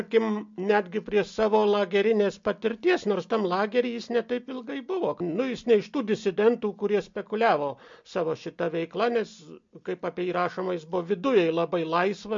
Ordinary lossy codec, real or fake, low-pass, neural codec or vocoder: MP3, 48 kbps; real; 7.2 kHz; none